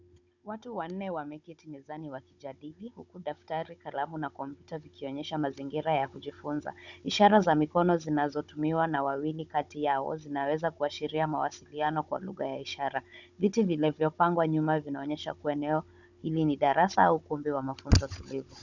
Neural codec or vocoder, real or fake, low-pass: codec, 16 kHz, 16 kbps, FunCodec, trained on Chinese and English, 50 frames a second; fake; 7.2 kHz